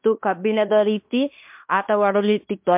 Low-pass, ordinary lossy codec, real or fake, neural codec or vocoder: 3.6 kHz; MP3, 32 kbps; fake; codec, 16 kHz in and 24 kHz out, 0.9 kbps, LongCat-Audio-Codec, fine tuned four codebook decoder